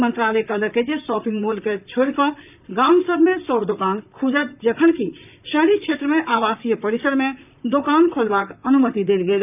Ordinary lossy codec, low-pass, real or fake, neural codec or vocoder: none; 3.6 kHz; fake; vocoder, 44.1 kHz, 128 mel bands, Pupu-Vocoder